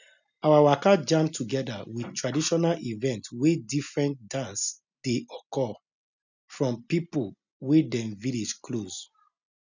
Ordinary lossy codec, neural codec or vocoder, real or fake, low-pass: none; none; real; 7.2 kHz